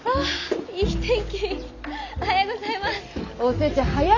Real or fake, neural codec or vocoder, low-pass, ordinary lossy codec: real; none; 7.2 kHz; none